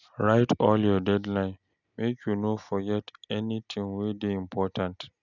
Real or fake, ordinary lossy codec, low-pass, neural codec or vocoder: real; none; 7.2 kHz; none